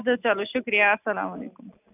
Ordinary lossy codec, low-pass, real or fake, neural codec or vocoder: none; 3.6 kHz; real; none